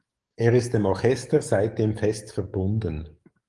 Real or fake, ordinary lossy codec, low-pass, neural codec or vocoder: fake; Opus, 24 kbps; 10.8 kHz; codec, 44.1 kHz, 7.8 kbps, DAC